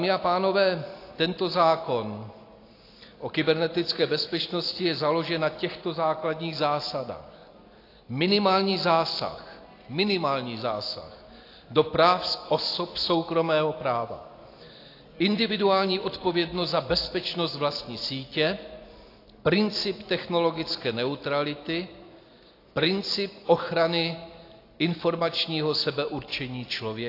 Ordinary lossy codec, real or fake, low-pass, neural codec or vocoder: AAC, 32 kbps; real; 5.4 kHz; none